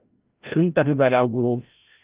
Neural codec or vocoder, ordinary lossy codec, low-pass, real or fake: codec, 16 kHz, 0.5 kbps, FreqCodec, larger model; Opus, 24 kbps; 3.6 kHz; fake